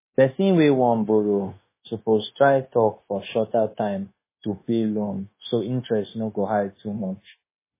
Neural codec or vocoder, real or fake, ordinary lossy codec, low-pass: codec, 16 kHz, 0.9 kbps, LongCat-Audio-Codec; fake; MP3, 16 kbps; 3.6 kHz